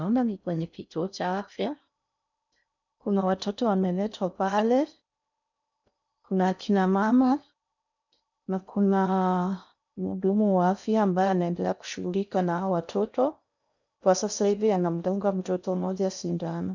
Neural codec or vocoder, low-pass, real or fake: codec, 16 kHz in and 24 kHz out, 0.6 kbps, FocalCodec, streaming, 4096 codes; 7.2 kHz; fake